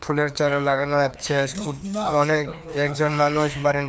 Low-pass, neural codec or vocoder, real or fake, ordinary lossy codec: none; codec, 16 kHz, 2 kbps, FreqCodec, larger model; fake; none